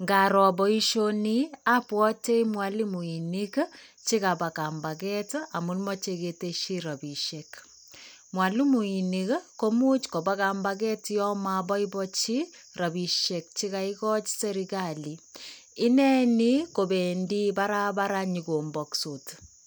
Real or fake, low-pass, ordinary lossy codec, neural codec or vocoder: real; none; none; none